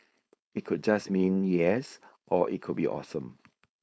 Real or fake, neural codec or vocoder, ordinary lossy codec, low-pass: fake; codec, 16 kHz, 4.8 kbps, FACodec; none; none